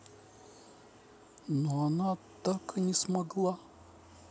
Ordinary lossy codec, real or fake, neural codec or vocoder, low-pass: none; real; none; none